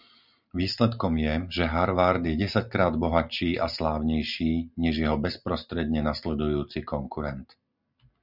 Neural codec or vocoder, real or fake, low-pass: none; real; 5.4 kHz